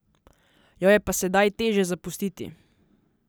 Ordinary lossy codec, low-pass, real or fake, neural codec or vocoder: none; none; real; none